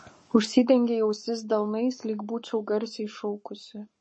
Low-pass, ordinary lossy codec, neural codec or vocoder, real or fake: 9.9 kHz; MP3, 32 kbps; codec, 44.1 kHz, 7.8 kbps, Pupu-Codec; fake